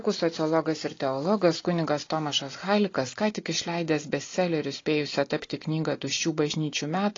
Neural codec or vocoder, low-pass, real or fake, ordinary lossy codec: none; 7.2 kHz; real; AAC, 32 kbps